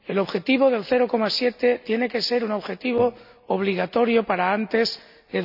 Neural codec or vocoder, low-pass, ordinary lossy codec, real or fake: none; 5.4 kHz; none; real